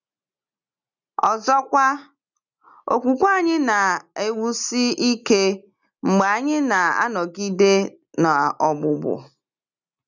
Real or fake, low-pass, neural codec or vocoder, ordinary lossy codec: real; 7.2 kHz; none; none